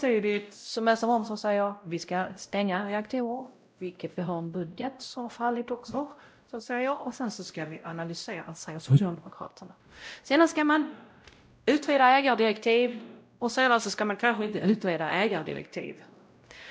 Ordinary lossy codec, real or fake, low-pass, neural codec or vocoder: none; fake; none; codec, 16 kHz, 0.5 kbps, X-Codec, WavLM features, trained on Multilingual LibriSpeech